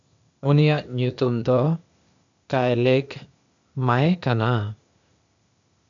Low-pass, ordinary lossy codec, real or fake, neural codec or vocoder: 7.2 kHz; MP3, 64 kbps; fake; codec, 16 kHz, 0.8 kbps, ZipCodec